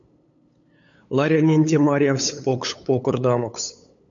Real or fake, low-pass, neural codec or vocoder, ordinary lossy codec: fake; 7.2 kHz; codec, 16 kHz, 8 kbps, FunCodec, trained on LibriTTS, 25 frames a second; MP3, 64 kbps